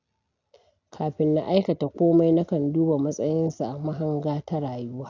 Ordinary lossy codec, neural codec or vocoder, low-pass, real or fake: none; none; 7.2 kHz; real